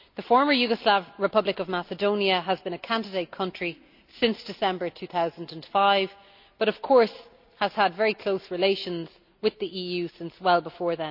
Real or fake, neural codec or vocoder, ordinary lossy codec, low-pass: real; none; none; 5.4 kHz